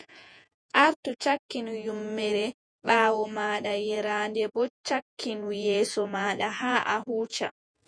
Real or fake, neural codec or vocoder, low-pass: fake; vocoder, 48 kHz, 128 mel bands, Vocos; 9.9 kHz